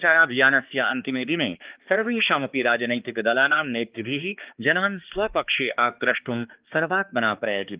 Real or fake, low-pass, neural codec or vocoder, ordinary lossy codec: fake; 3.6 kHz; codec, 16 kHz, 2 kbps, X-Codec, HuBERT features, trained on balanced general audio; Opus, 32 kbps